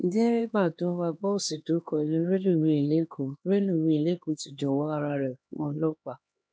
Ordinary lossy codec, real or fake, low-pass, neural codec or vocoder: none; fake; none; codec, 16 kHz, 2 kbps, X-Codec, HuBERT features, trained on LibriSpeech